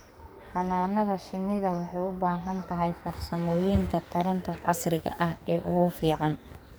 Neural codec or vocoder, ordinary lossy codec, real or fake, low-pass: codec, 44.1 kHz, 2.6 kbps, SNAC; none; fake; none